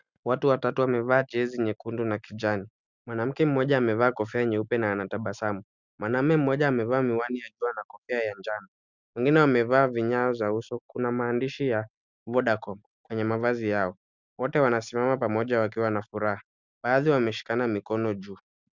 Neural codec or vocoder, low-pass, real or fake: none; 7.2 kHz; real